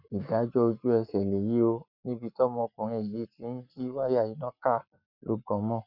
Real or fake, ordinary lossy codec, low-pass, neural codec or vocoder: fake; none; 5.4 kHz; codec, 44.1 kHz, 7.8 kbps, Pupu-Codec